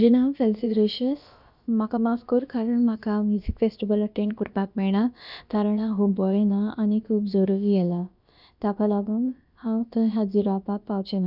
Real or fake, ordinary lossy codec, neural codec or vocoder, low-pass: fake; none; codec, 16 kHz, about 1 kbps, DyCAST, with the encoder's durations; 5.4 kHz